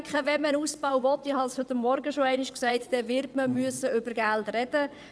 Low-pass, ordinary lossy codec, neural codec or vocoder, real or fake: none; none; vocoder, 22.05 kHz, 80 mel bands, WaveNeXt; fake